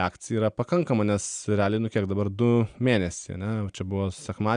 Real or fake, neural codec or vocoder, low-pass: real; none; 9.9 kHz